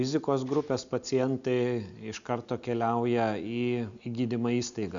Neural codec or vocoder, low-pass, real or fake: none; 7.2 kHz; real